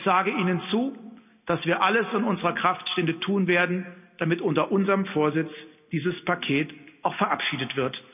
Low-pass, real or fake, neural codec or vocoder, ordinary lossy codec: 3.6 kHz; real; none; none